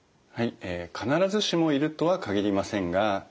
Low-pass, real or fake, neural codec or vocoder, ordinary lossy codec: none; real; none; none